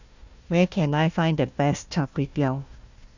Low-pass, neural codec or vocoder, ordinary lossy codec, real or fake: 7.2 kHz; codec, 16 kHz, 1 kbps, FunCodec, trained on Chinese and English, 50 frames a second; none; fake